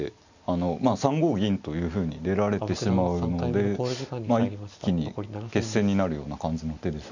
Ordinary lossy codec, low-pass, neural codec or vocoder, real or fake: none; 7.2 kHz; none; real